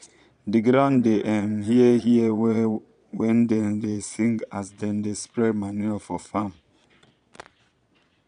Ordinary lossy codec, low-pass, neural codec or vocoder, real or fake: none; 9.9 kHz; vocoder, 22.05 kHz, 80 mel bands, Vocos; fake